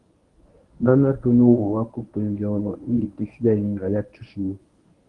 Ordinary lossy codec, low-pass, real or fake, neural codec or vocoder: Opus, 24 kbps; 10.8 kHz; fake; codec, 24 kHz, 0.9 kbps, WavTokenizer, medium speech release version 1